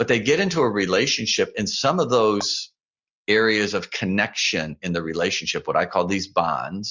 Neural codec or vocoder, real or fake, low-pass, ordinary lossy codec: none; real; 7.2 kHz; Opus, 64 kbps